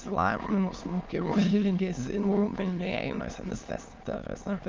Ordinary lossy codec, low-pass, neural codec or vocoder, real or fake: Opus, 24 kbps; 7.2 kHz; autoencoder, 22.05 kHz, a latent of 192 numbers a frame, VITS, trained on many speakers; fake